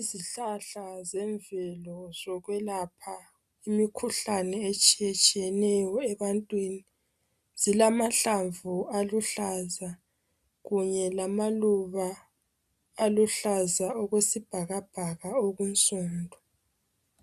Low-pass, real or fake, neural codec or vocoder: 14.4 kHz; real; none